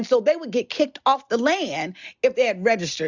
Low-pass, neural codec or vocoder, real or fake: 7.2 kHz; none; real